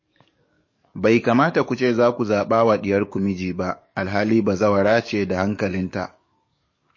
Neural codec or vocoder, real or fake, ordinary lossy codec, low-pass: codec, 44.1 kHz, 7.8 kbps, DAC; fake; MP3, 32 kbps; 7.2 kHz